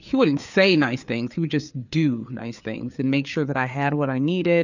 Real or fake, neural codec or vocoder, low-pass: fake; codec, 16 kHz, 4 kbps, FreqCodec, larger model; 7.2 kHz